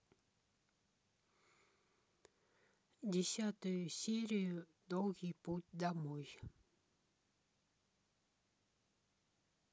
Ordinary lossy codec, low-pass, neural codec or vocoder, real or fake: none; none; none; real